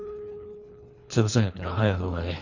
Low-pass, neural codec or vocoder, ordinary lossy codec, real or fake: 7.2 kHz; codec, 24 kHz, 3 kbps, HILCodec; none; fake